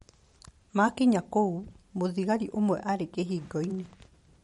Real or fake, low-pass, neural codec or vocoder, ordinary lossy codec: fake; 19.8 kHz; vocoder, 44.1 kHz, 128 mel bands every 512 samples, BigVGAN v2; MP3, 48 kbps